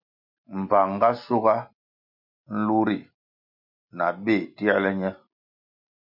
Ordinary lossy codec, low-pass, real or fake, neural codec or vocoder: MP3, 48 kbps; 5.4 kHz; real; none